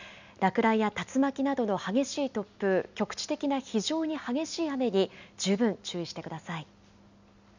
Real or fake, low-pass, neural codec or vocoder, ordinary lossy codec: real; 7.2 kHz; none; none